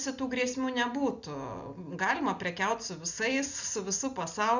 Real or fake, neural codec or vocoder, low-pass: real; none; 7.2 kHz